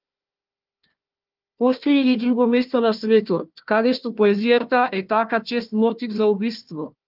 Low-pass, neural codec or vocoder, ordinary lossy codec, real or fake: 5.4 kHz; codec, 16 kHz, 1 kbps, FunCodec, trained on Chinese and English, 50 frames a second; Opus, 32 kbps; fake